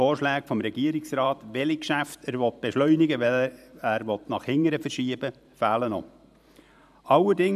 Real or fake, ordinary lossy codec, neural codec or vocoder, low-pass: fake; none; vocoder, 44.1 kHz, 128 mel bands every 512 samples, BigVGAN v2; 14.4 kHz